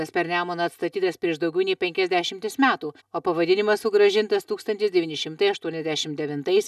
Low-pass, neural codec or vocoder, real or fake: 14.4 kHz; none; real